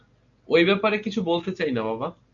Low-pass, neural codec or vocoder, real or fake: 7.2 kHz; none; real